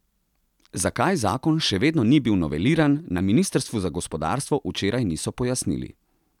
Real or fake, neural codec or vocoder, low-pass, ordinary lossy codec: real; none; 19.8 kHz; none